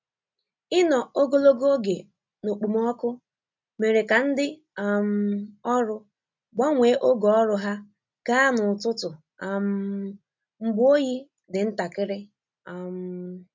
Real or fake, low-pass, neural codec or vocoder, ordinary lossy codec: real; 7.2 kHz; none; MP3, 64 kbps